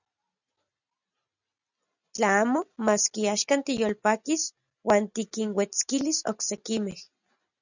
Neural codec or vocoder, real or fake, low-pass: none; real; 7.2 kHz